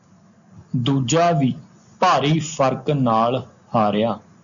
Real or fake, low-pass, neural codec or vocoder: real; 7.2 kHz; none